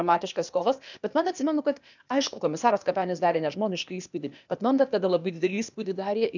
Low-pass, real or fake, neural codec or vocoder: 7.2 kHz; fake; codec, 16 kHz, 0.8 kbps, ZipCodec